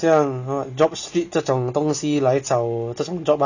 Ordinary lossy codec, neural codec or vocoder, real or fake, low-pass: none; none; real; 7.2 kHz